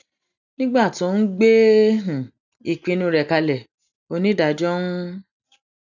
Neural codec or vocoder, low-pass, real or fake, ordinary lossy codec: none; 7.2 kHz; real; none